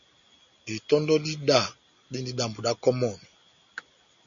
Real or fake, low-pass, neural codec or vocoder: real; 7.2 kHz; none